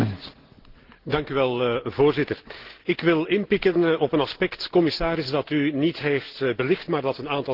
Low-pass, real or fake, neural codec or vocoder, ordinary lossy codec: 5.4 kHz; real; none; Opus, 16 kbps